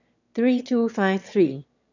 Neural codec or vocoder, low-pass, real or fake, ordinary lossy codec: autoencoder, 22.05 kHz, a latent of 192 numbers a frame, VITS, trained on one speaker; 7.2 kHz; fake; none